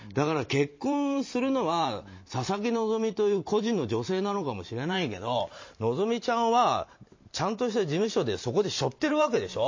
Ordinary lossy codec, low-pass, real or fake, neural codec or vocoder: MP3, 32 kbps; 7.2 kHz; fake; vocoder, 44.1 kHz, 128 mel bands every 512 samples, BigVGAN v2